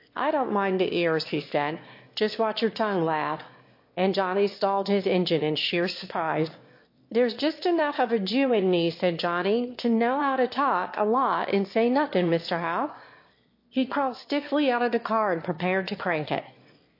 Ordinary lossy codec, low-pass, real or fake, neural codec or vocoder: MP3, 32 kbps; 5.4 kHz; fake; autoencoder, 22.05 kHz, a latent of 192 numbers a frame, VITS, trained on one speaker